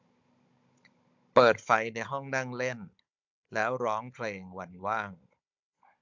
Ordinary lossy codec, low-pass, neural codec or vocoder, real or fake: MP3, 64 kbps; 7.2 kHz; codec, 16 kHz, 16 kbps, FunCodec, trained on Chinese and English, 50 frames a second; fake